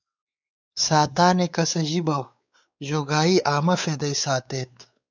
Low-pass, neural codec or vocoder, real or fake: 7.2 kHz; codec, 16 kHz, 4 kbps, X-Codec, WavLM features, trained on Multilingual LibriSpeech; fake